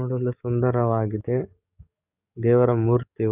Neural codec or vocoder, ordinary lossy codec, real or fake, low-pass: codec, 44.1 kHz, 7.8 kbps, DAC; none; fake; 3.6 kHz